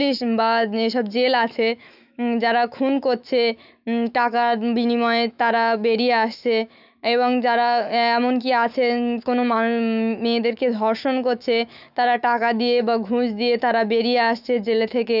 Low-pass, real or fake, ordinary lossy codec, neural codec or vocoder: 5.4 kHz; fake; none; autoencoder, 48 kHz, 128 numbers a frame, DAC-VAE, trained on Japanese speech